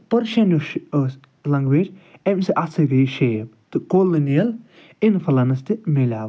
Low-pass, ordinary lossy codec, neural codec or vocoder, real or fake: none; none; none; real